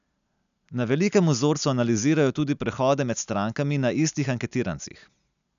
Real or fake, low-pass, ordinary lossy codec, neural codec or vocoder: real; 7.2 kHz; none; none